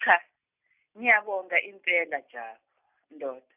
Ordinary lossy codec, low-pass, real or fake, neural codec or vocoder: none; 3.6 kHz; real; none